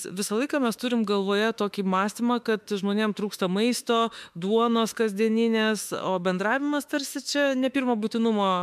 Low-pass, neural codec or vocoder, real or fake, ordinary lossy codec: 14.4 kHz; autoencoder, 48 kHz, 32 numbers a frame, DAC-VAE, trained on Japanese speech; fake; MP3, 96 kbps